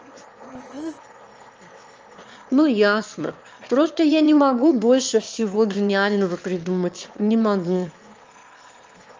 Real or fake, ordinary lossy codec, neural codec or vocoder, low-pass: fake; Opus, 24 kbps; autoencoder, 22.05 kHz, a latent of 192 numbers a frame, VITS, trained on one speaker; 7.2 kHz